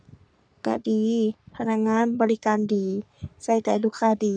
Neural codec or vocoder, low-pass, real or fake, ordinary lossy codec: codec, 44.1 kHz, 3.4 kbps, Pupu-Codec; 9.9 kHz; fake; none